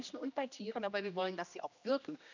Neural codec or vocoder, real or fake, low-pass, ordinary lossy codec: codec, 16 kHz, 1 kbps, X-Codec, HuBERT features, trained on general audio; fake; 7.2 kHz; none